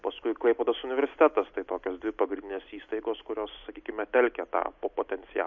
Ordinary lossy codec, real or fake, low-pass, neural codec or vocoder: MP3, 48 kbps; real; 7.2 kHz; none